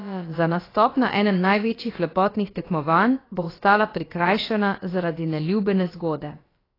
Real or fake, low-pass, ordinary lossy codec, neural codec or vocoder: fake; 5.4 kHz; AAC, 24 kbps; codec, 16 kHz, about 1 kbps, DyCAST, with the encoder's durations